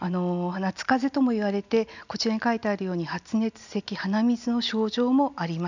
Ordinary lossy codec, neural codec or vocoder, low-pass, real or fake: none; none; 7.2 kHz; real